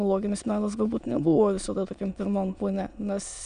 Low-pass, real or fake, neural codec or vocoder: 9.9 kHz; fake; autoencoder, 22.05 kHz, a latent of 192 numbers a frame, VITS, trained on many speakers